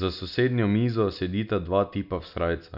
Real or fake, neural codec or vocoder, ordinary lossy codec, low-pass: real; none; none; 5.4 kHz